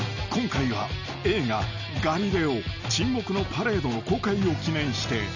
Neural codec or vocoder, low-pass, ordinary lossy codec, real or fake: none; 7.2 kHz; none; real